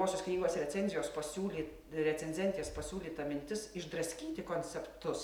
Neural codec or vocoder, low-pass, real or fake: none; 19.8 kHz; real